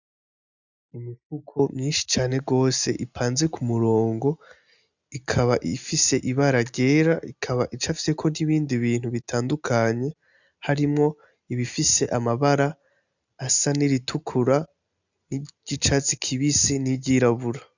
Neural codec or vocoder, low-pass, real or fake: none; 7.2 kHz; real